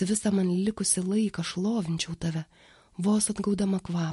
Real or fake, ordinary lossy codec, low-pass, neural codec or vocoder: real; MP3, 48 kbps; 14.4 kHz; none